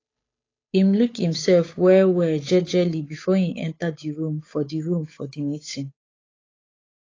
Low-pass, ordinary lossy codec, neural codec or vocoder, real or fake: 7.2 kHz; AAC, 32 kbps; codec, 16 kHz, 8 kbps, FunCodec, trained on Chinese and English, 25 frames a second; fake